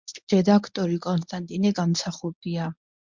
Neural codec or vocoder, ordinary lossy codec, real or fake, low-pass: codec, 24 kHz, 0.9 kbps, WavTokenizer, medium speech release version 1; MP3, 64 kbps; fake; 7.2 kHz